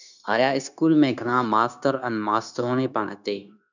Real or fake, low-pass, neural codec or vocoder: fake; 7.2 kHz; codec, 16 kHz, 0.9 kbps, LongCat-Audio-Codec